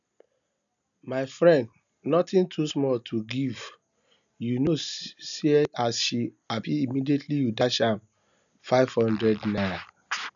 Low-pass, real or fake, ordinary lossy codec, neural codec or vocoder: 7.2 kHz; real; none; none